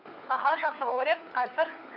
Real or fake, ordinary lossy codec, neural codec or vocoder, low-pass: fake; none; codec, 16 kHz, 4 kbps, FunCodec, trained on LibriTTS, 50 frames a second; 5.4 kHz